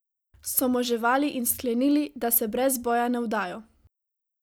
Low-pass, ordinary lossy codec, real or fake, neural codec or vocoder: none; none; real; none